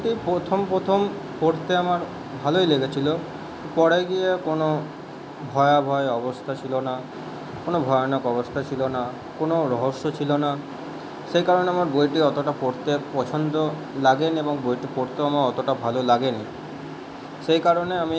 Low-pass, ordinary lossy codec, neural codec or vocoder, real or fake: none; none; none; real